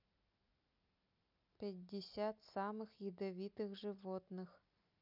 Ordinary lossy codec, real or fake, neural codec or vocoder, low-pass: none; real; none; 5.4 kHz